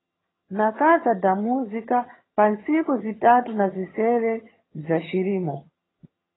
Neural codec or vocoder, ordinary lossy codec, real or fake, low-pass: vocoder, 22.05 kHz, 80 mel bands, HiFi-GAN; AAC, 16 kbps; fake; 7.2 kHz